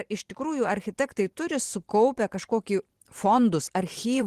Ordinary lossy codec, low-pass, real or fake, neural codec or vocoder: Opus, 16 kbps; 14.4 kHz; fake; vocoder, 44.1 kHz, 128 mel bands every 512 samples, BigVGAN v2